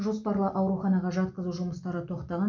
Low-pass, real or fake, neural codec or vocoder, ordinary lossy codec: 7.2 kHz; real; none; none